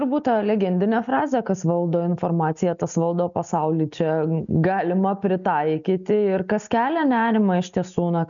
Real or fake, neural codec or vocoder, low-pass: real; none; 7.2 kHz